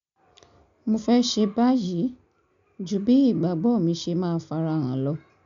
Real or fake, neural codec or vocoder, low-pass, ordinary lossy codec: real; none; 7.2 kHz; none